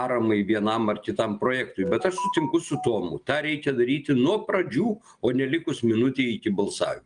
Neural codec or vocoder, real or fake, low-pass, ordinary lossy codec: none; real; 9.9 kHz; Opus, 32 kbps